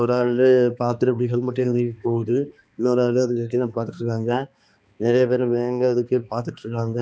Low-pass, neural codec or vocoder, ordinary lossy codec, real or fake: none; codec, 16 kHz, 2 kbps, X-Codec, HuBERT features, trained on balanced general audio; none; fake